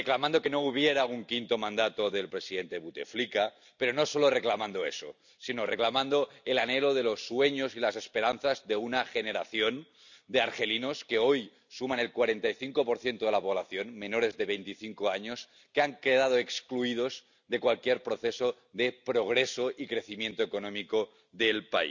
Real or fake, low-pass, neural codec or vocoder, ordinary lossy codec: real; 7.2 kHz; none; none